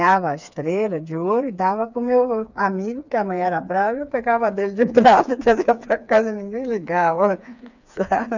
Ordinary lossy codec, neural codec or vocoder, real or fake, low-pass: none; codec, 16 kHz, 4 kbps, FreqCodec, smaller model; fake; 7.2 kHz